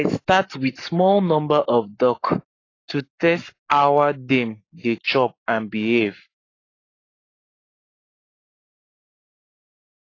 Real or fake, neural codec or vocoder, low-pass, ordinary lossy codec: fake; codec, 44.1 kHz, 7.8 kbps, Pupu-Codec; 7.2 kHz; AAC, 32 kbps